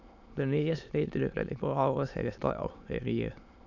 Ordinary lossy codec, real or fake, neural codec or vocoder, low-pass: none; fake; autoencoder, 22.05 kHz, a latent of 192 numbers a frame, VITS, trained on many speakers; 7.2 kHz